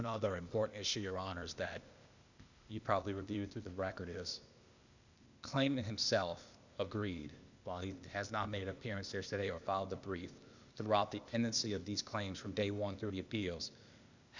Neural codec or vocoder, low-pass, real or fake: codec, 16 kHz, 0.8 kbps, ZipCodec; 7.2 kHz; fake